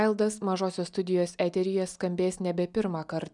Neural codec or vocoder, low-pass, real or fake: none; 10.8 kHz; real